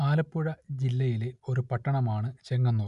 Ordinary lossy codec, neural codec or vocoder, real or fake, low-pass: none; none; real; 10.8 kHz